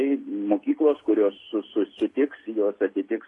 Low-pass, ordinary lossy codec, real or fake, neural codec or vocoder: 10.8 kHz; AAC, 32 kbps; real; none